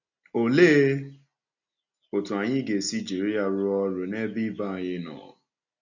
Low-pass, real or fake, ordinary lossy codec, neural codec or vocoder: 7.2 kHz; real; none; none